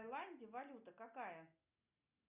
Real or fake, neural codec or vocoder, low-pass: real; none; 3.6 kHz